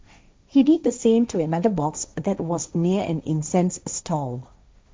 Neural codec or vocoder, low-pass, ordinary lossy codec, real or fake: codec, 16 kHz, 1.1 kbps, Voila-Tokenizer; none; none; fake